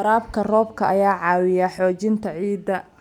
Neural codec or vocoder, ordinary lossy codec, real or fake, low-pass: none; none; real; 19.8 kHz